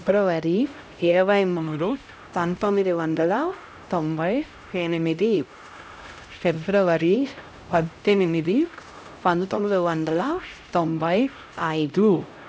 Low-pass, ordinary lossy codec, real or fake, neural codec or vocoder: none; none; fake; codec, 16 kHz, 0.5 kbps, X-Codec, HuBERT features, trained on LibriSpeech